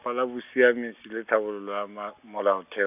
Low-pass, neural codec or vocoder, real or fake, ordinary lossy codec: 3.6 kHz; none; real; none